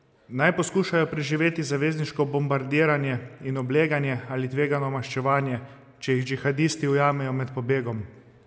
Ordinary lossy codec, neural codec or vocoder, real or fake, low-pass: none; none; real; none